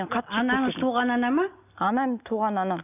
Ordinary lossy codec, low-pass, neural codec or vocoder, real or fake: none; 3.6 kHz; none; real